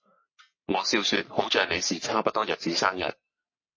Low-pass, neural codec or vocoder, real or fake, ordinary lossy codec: 7.2 kHz; codec, 44.1 kHz, 3.4 kbps, Pupu-Codec; fake; MP3, 32 kbps